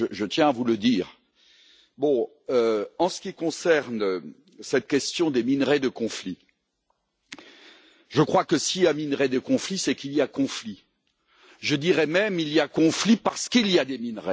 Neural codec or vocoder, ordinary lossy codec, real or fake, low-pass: none; none; real; none